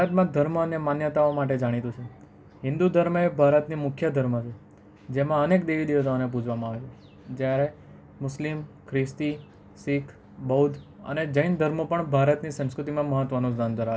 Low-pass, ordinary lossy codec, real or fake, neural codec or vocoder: none; none; real; none